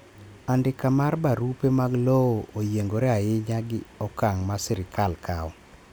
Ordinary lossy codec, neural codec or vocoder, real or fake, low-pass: none; none; real; none